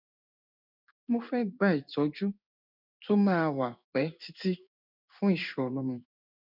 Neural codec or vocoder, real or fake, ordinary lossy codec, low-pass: codec, 16 kHz in and 24 kHz out, 1 kbps, XY-Tokenizer; fake; none; 5.4 kHz